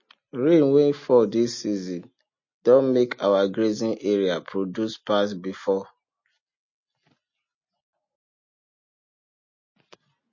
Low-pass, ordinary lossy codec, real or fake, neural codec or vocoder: 7.2 kHz; MP3, 32 kbps; real; none